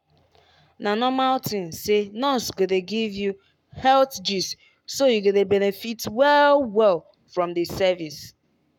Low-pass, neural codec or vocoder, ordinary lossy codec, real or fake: 19.8 kHz; codec, 44.1 kHz, 7.8 kbps, Pupu-Codec; none; fake